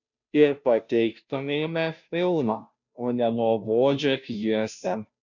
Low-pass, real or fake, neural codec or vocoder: 7.2 kHz; fake; codec, 16 kHz, 0.5 kbps, FunCodec, trained on Chinese and English, 25 frames a second